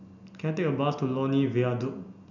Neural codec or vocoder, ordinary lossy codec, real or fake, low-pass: none; none; real; 7.2 kHz